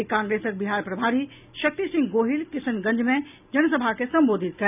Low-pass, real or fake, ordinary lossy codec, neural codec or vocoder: 3.6 kHz; real; none; none